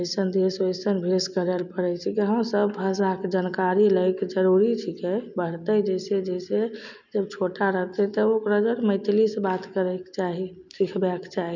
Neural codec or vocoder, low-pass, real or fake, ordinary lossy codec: none; 7.2 kHz; real; none